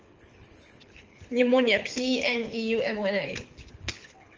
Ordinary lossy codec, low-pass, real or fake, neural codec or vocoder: Opus, 24 kbps; 7.2 kHz; fake; codec, 24 kHz, 6 kbps, HILCodec